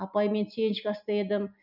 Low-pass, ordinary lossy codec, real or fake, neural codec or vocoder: 5.4 kHz; none; real; none